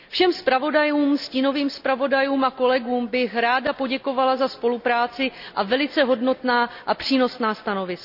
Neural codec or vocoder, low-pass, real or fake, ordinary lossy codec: none; 5.4 kHz; real; none